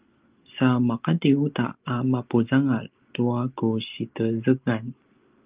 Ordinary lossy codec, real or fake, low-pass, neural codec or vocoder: Opus, 32 kbps; real; 3.6 kHz; none